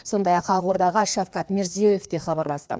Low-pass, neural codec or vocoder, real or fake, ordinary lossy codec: none; codec, 16 kHz, 2 kbps, FreqCodec, larger model; fake; none